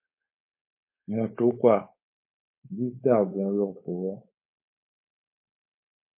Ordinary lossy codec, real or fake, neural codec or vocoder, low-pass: MP3, 24 kbps; fake; codec, 16 kHz, 4.8 kbps, FACodec; 3.6 kHz